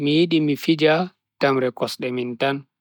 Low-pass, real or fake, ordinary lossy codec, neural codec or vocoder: 19.8 kHz; real; none; none